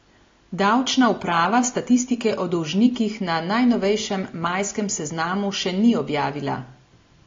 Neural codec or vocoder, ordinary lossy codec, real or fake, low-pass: none; AAC, 32 kbps; real; 7.2 kHz